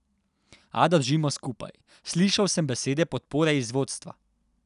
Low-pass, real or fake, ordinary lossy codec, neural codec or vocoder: 10.8 kHz; real; none; none